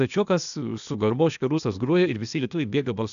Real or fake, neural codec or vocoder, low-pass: fake; codec, 16 kHz, 0.8 kbps, ZipCodec; 7.2 kHz